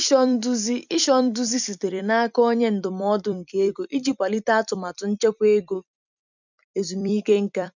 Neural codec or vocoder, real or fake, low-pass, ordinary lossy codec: vocoder, 44.1 kHz, 128 mel bands every 256 samples, BigVGAN v2; fake; 7.2 kHz; none